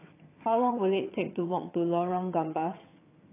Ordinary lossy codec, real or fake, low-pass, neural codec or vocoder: AAC, 24 kbps; fake; 3.6 kHz; vocoder, 22.05 kHz, 80 mel bands, HiFi-GAN